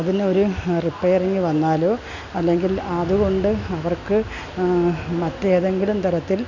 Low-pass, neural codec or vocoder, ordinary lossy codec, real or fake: 7.2 kHz; none; none; real